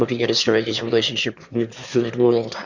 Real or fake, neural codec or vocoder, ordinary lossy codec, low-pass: fake; autoencoder, 22.05 kHz, a latent of 192 numbers a frame, VITS, trained on one speaker; Opus, 64 kbps; 7.2 kHz